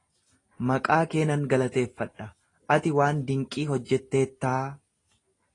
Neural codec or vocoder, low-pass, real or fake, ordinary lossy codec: none; 10.8 kHz; real; AAC, 32 kbps